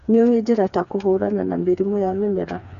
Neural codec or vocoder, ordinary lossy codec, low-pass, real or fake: codec, 16 kHz, 4 kbps, FreqCodec, smaller model; none; 7.2 kHz; fake